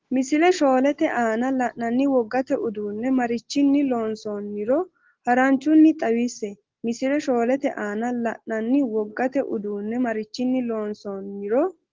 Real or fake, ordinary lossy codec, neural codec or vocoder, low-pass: real; Opus, 16 kbps; none; 7.2 kHz